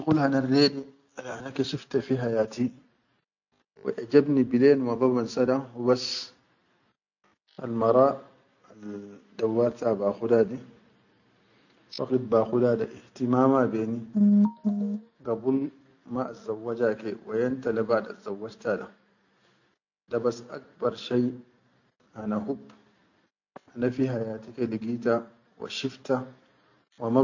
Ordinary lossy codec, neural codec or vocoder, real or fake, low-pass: none; none; real; 7.2 kHz